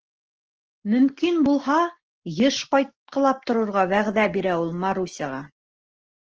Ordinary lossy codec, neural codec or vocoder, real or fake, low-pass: Opus, 16 kbps; none; real; 7.2 kHz